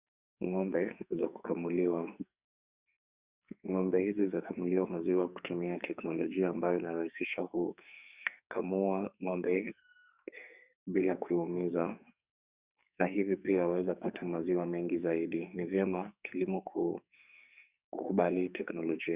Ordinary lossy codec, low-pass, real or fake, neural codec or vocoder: Opus, 64 kbps; 3.6 kHz; fake; codec, 44.1 kHz, 2.6 kbps, SNAC